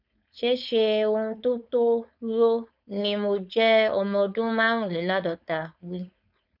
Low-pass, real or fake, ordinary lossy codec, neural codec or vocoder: 5.4 kHz; fake; none; codec, 16 kHz, 4.8 kbps, FACodec